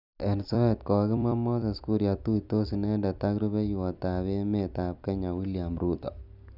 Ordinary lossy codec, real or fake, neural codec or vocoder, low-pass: none; fake; vocoder, 44.1 kHz, 128 mel bands every 256 samples, BigVGAN v2; 5.4 kHz